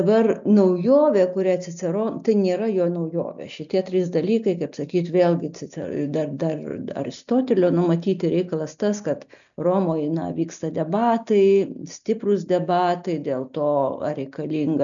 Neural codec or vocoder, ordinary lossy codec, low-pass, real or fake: none; MP3, 96 kbps; 7.2 kHz; real